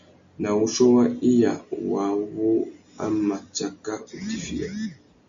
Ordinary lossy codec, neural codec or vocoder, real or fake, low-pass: MP3, 64 kbps; none; real; 7.2 kHz